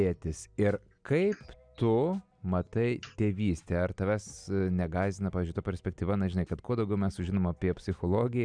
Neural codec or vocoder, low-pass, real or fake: none; 9.9 kHz; real